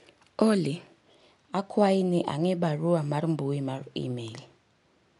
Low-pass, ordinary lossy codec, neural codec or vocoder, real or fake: 10.8 kHz; none; none; real